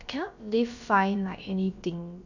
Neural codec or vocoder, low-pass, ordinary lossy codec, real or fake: codec, 16 kHz, about 1 kbps, DyCAST, with the encoder's durations; 7.2 kHz; none; fake